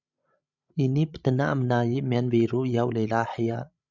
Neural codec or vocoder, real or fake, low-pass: codec, 16 kHz, 16 kbps, FreqCodec, larger model; fake; 7.2 kHz